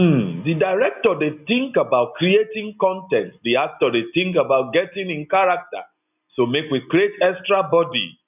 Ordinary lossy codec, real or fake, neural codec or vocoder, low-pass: none; real; none; 3.6 kHz